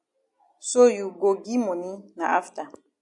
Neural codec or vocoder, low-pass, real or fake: none; 10.8 kHz; real